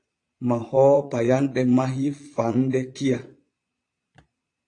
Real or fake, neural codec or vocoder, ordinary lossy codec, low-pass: fake; vocoder, 22.05 kHz, 80 mel bands, Vocos; AAC, 48 kbps; 9.9 kHz